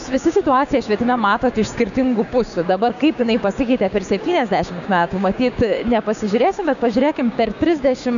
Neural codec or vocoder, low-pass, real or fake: codec, 16 kHz, 6 kbps, DAC; 7.2 kHz; fake